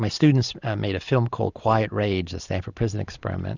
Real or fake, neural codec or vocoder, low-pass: real; none; 7.2 kHz